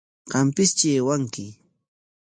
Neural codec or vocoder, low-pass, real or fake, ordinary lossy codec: none; 9.9 kHz; real; MP3, 48 kbps